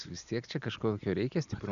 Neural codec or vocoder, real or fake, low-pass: none; real; 7.2 kHz